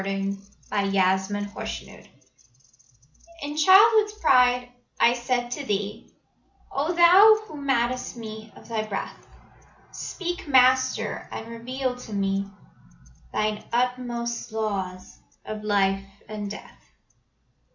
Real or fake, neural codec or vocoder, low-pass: real; none; 7.2 kHz